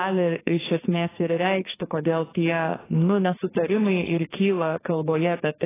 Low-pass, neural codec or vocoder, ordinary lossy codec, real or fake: 3.6 kHz; codec, 44.1 kHz, 2.6 kbps, SNAC; AAC, 16 kbps; fake